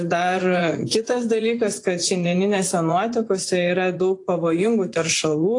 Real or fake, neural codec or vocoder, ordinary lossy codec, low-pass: fake; vocoder, 24 kHz, 100 mel bands, Vocos; AAC, 48 kbps; 10.8 kHz